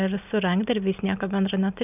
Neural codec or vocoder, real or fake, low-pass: none; real; 3.6 kHz